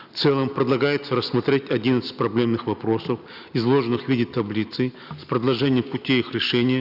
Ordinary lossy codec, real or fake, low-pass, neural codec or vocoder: none; real; 5.4 kHz; none